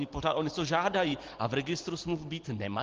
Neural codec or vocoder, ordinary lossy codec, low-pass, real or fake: none; Opus, 16 kbps; 7.2 kHz; real